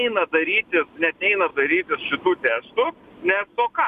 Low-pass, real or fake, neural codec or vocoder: 14.4 kHz; real; none